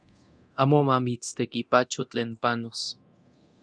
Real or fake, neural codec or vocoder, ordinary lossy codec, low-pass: fake; codec, 24 kHz, 0.9 kbps, DualCodec; Opus, 64 kbps; 9.9 kHz